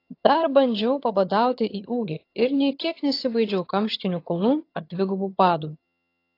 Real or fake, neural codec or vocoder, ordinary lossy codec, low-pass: fake; vocoder, 22.05 kHz, 80 mel bands, HiFi-GAN; AAC, 32 kbps; 5.4 kHz